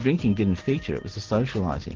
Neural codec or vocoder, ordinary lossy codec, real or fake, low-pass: none; Opus, 16 kbps; real; 7.2 kHz